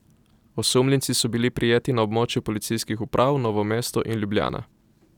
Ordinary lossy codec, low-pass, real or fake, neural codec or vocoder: none; 19.8 kHz; real; none